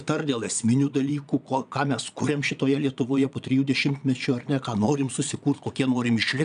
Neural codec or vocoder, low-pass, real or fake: vocoder, 22.05 kHz, 80 mel bands, Vocos; 9.9 kHz; fake